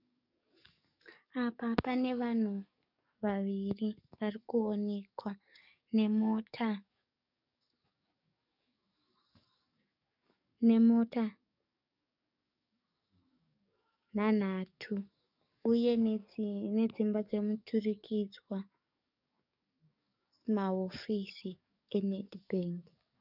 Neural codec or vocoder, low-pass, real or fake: codec, 44.1 kHz, 7.8 kbps, DAC; 5.4 kHz; fake